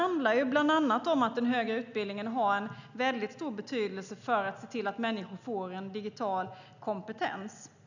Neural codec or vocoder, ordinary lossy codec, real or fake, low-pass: none; none; real; 7.2 kHz